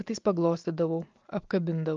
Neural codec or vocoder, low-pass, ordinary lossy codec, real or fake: none; 7.2 kHz; Opus, 32 kbps; real